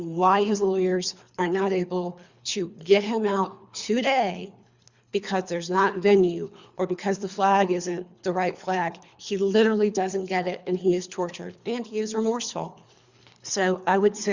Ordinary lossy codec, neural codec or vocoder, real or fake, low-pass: Opus, 64 kbps; codec, 24 kHz, 3 kbps, HILCodec; fake; 7.2 kHz